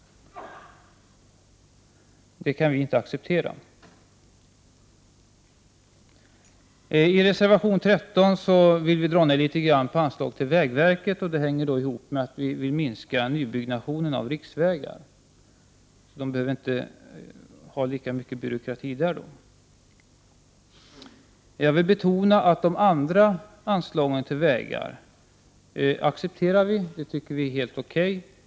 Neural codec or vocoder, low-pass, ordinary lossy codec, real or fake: none; none; none; real